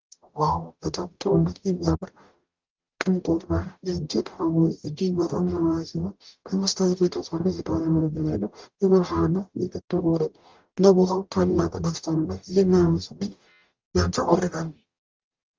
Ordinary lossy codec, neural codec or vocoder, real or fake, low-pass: Opus, 32 kbps; codec, 44.1 kHz, 0.9 kbps, DAC; fake; 7.2 kHz